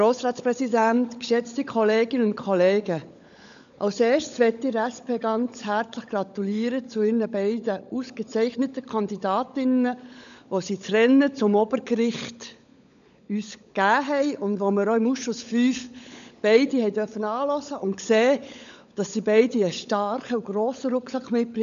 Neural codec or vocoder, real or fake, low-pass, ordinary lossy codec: codec, 16 kHz, 16 kbps, FunCodec, trained on LibriTTS, 50 frames a second; fake; 7.2 kHz; none